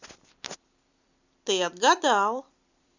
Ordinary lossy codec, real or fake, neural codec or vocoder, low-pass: none; fake; vocoder, 44.1 kHz, 128 mel bands every 256 samples, BigVGAN v2; 7.2 kHz